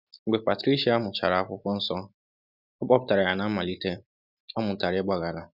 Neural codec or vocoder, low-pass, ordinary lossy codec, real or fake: none; 5.4 kHz; none; real